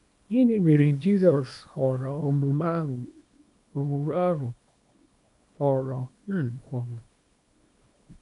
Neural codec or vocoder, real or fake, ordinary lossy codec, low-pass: codec, 24 kHz, 0.9 kbps, WavTokenizer, small release; fake; none; 10.8 kHz